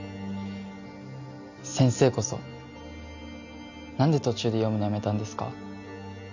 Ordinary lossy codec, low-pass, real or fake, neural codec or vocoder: none; 7.2 kHz; real; none